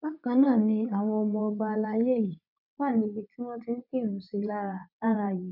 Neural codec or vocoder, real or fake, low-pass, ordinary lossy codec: vocoder, 22.05 kHz, 80 mel bands, WaveNeXt; fake; 5.4 kHz; none